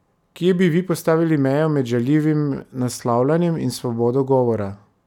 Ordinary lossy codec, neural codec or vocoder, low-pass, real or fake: none; none; 19.8 kHz; real